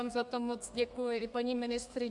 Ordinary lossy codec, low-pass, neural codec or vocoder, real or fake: AAC, 64 kbps; 10.8 kHz; codec, 32 kHz, 1.9 kbps, SNAC; fake